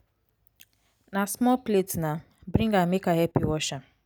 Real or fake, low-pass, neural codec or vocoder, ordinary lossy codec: real; none; none; none